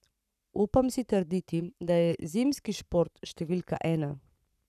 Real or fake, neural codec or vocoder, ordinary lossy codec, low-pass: fake; codec, 44.1 kHz, 7.8 kbps, Pupu-Codec; none; 14.4 kHz